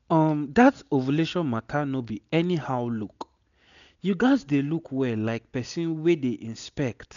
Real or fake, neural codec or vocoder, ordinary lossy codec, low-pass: real; none; none; 7.2 kHz